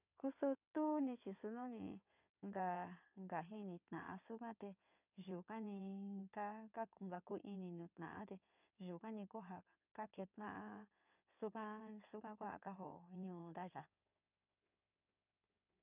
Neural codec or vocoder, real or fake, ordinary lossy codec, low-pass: codec, 16 kHz in and 24 kHz out, 2.2 kbps, FireRedTTS-2 codec; fake; none; 3.6 kHz